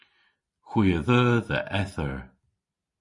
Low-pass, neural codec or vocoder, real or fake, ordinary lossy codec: 10.8 kHz; none; real; MP3, 48 kbps